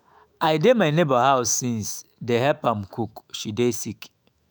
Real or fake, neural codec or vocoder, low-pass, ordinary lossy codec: fake; autoencoder, 48 kHz, 128 numbers a frame, DAC-VAE, trained on Japanese speech; none; none